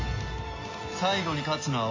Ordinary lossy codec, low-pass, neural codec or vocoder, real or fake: AAC, 32 kbps; 7.2 kHz; none; real